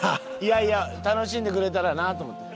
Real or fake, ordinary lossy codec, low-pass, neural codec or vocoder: real; none; none; none